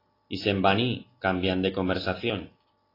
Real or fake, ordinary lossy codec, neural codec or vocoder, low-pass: real; AAC, 24 kbps; none; 5.4 kHz